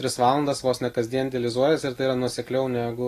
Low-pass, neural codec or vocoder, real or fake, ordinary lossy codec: 14.4 kHz; none; real; AAC, 48 kbps